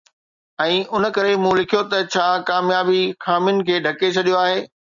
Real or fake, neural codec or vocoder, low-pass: real; none; 7.2 kHz